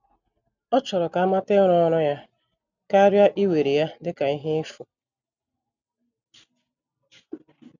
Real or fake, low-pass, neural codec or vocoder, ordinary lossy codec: real; 7.2 kHz; none; none